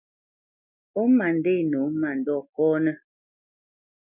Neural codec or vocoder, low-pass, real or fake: none; 3.6 kHz; real